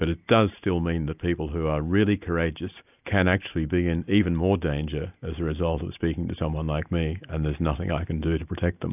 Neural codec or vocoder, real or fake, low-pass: none; real; 3.6 kHz